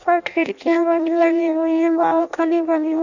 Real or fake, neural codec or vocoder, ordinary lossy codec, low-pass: fake; codec, 16 kHz in and 24 kHz out, 0.6 kbps, FireRedTTS-2 codec; none; 7.2 kHz